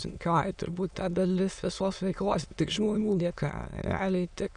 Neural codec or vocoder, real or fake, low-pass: autoencoder, 22.05 kHz, a latent of 192 numbers a frame, VITS, trained on many speakers; fake; 9.9 kHz